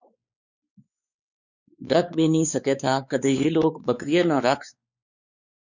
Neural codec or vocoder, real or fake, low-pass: codec, 16 kHz, 4 kbps, X-Codec, WavLM features, trained on Multilingual LibriSpeech; fake; 7.2 kHz